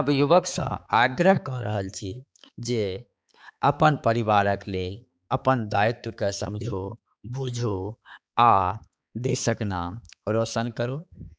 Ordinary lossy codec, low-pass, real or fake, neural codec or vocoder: none; none; fake; codec, 16 kHz, 4 kbps, X-Codec, HuBERT features, trained on balanced general audio